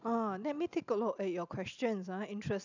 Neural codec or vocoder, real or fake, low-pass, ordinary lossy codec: none; real; 7.2 kHz; none